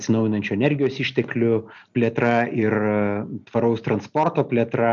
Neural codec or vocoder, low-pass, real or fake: none; 7.2 kHz; real